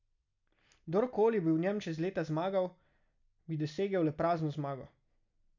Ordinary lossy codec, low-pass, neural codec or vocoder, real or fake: none; 7.2 kHz; none; real